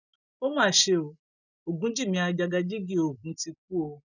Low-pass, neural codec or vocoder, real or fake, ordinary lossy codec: 7.2 kHz; none; real; none